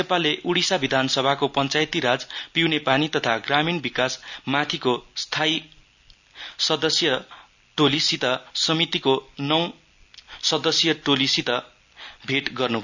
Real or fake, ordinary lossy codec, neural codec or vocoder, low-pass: real; none; none; 7.2 kHz